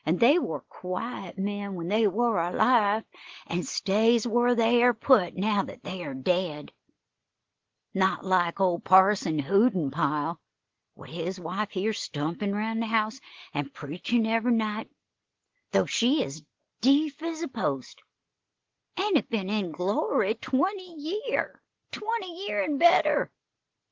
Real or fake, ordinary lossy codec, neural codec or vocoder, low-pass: real; Opus, 16 kbps; none; 7.2 kHz